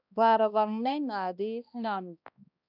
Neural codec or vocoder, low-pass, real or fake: codec, 16 kHz, 1 kbps, X-Codec, HuBERT features, trained on balanced general audio; 5.4 kHz; fake